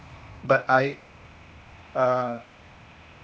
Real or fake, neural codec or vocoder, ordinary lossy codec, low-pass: fake; codec, 16 kHz, 0.8 kbps, ZipCodec; none; none